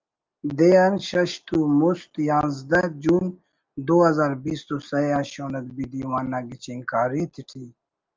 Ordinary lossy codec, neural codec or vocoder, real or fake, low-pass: Opus, 32 kbps; none; real; 7.2 kHz